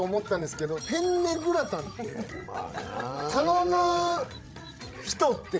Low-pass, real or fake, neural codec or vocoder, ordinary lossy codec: none; fake; codec, 16 kHz, 16 kbps, FreqCodec, larger model; none